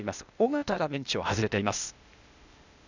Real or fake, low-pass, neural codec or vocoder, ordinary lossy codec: fake; 7.2 kHz; codec, 16 kHz, 0.8 kbps, ZipCodec; none